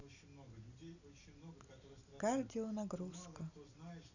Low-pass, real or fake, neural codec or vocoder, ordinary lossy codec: 7.2 kHz; real; none; none